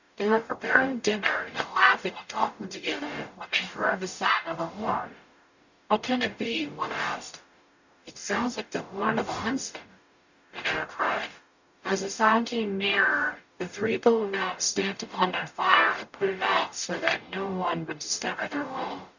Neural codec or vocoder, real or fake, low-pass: codec, 44.1 kHz, 0.9 kbps, DAC; fake; 7.2 kHz